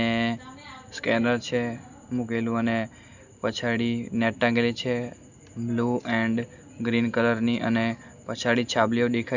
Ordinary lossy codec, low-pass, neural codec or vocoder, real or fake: none; 7.2 kHz; none; real